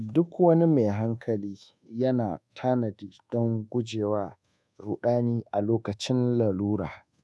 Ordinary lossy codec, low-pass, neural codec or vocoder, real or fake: none; none; codec, 24 kHz, 1.2 kbps, DualCodec; fake